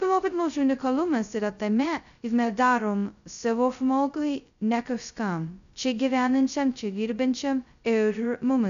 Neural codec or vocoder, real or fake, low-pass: codec, 16 kHz, 0.2 kbps, FocalCodec; fake; 7.2 kHz